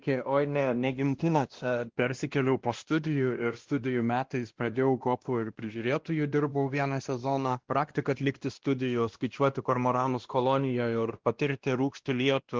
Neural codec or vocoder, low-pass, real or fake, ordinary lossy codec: codec, 16 kHz, 1 kbps, X-Codec, WavLM features, trained on Multilingual LibriSpeech; 7.2 kHz; fake; Opus, 16 kbps